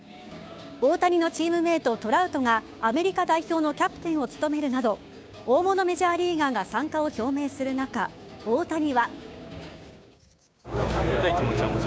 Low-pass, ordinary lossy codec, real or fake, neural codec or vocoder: none; none; fake; codec, 16 kHz, 6 kbps, DAC